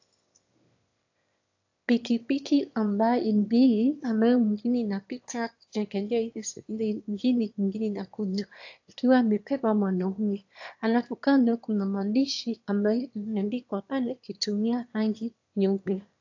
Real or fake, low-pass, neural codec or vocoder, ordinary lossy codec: fake; 7.2 kHz; autoencoder, 22.05 kHz, a latent of 192 numbers a frame, VITS, trained on one speaker; AAC, 48 kbps